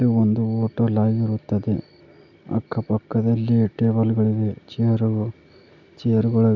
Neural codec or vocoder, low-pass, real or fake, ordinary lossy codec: none; 7.2 kHz; real; none